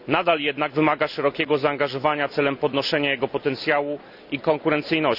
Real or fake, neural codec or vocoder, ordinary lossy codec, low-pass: real; none; none; 5.4 kHz